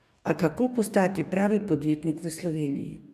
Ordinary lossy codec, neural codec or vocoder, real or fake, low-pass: none; codec, 44.1 kHz, 2.6 kbps, DAC; fake; 14.4 kHz